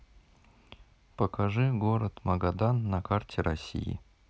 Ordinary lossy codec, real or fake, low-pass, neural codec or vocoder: none; real; none; none